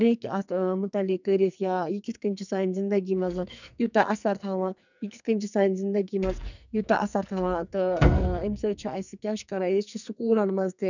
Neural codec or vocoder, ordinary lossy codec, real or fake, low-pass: codec, 44.1 kHz, 2.6 kbps, SNAC; none; fake; 7.2 kHz